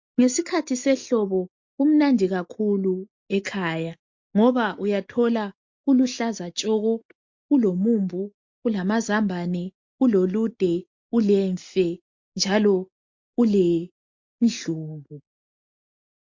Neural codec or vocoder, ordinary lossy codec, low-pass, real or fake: none; MP3, 48 kbps; 7.2 kHz; real